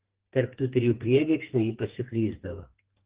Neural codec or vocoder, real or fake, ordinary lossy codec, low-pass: codec, 44.1 kHz, 2.6 kbps, SNAC; fake; Opus, 16 kbps; 3.6 kHz